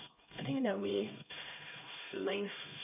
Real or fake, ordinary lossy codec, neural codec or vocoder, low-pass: fake; none; codec, 16 kHz, 1 kbps, X-Codec, HuBERT features, trained on LibriSpeech; 3.6 kHz